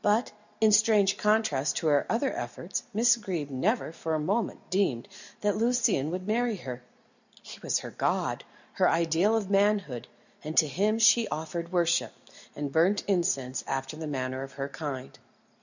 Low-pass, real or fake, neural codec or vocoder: 7.2 kHz; real; none